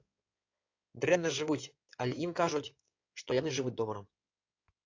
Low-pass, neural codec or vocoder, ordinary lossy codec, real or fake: 7.2 kHz; codec, 16 kHz in and 24 kHz out, 2.2 kbps, FireRedTTS-2 codec; AAC, 48 kbps; fake